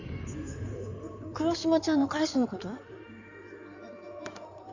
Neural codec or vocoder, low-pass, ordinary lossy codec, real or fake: codec, 16 kHz in and 24 kHz out, 2.2 kbps, FireRedTTS-2 codec; 7.2 kHz; none; fake